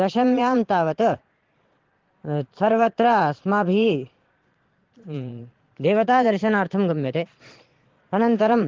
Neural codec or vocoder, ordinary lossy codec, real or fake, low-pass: vocoder, 22.05 kHz, 80 mel bands, Vocos; Opus, 16 kbps; fake; 7.2 kHz